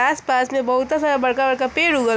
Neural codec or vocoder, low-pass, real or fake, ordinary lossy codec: none; none; real; none